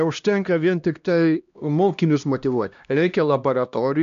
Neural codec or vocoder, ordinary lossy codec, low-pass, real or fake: codec, 16 kHz, 1 kbps, X-Codec, HuBERT features, trained on LibriSpeech; AAC, 96 kbps; 7.2 kHz; fake